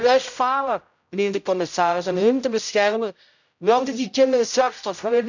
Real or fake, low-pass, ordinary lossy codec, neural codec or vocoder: fake; 7.2 kHz; none; codec, 16 kHz, 0.5 kbps, X-Codec, HuBERT features, trained on general audio